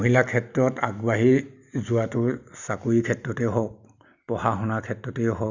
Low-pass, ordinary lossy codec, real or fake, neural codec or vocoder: 7.2 kHz; none; real; none